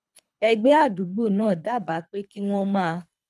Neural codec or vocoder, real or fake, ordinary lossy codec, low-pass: codec, 24 kHz, 3 kbps, HILCodec; fake; none; none